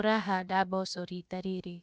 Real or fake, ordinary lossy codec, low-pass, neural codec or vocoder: fake; none; none; codec, 16 kHz, about 1 kbps, DyCAST, with the encoder's durations